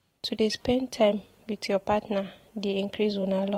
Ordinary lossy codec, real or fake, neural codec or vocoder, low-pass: AAC, 48 kbps; fake; vocoder, 44.1 kHz, 128 mel bands every 512 samples, BigVGAN v2; 19.8 kHz